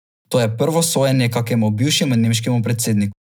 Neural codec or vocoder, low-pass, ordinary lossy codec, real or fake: none; none; none; real